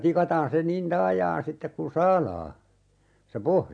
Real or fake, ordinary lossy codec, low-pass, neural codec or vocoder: real; MP3, 64 kbps; 9.9 kHz; none